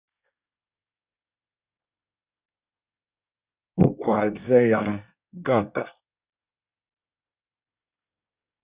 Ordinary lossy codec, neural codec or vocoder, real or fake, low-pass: Opus, 64 kbps; codec, 24 kHz, 1 kbps, SNAC; fake; 3.6 kHz